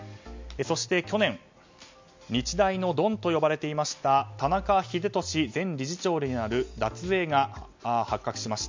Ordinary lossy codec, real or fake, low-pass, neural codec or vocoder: MP3, 64 kbps; real; 7.2 kHz; none